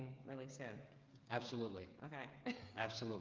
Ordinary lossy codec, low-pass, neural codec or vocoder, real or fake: Opus, 32 kbps; 7.2 kHz; codec, 16 kHz, 8 kbps, FreqCodec, smaller model; fake